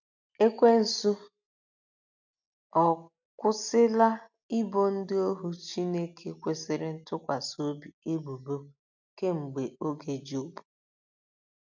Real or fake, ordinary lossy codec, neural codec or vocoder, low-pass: real; none; none; 7.2 kHz